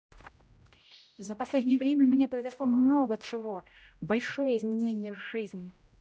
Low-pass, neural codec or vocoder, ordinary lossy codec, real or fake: none; codec, 16 kHz, 0.5 kbps, X-Codec, HuBERT features, trained on general audio; none; fake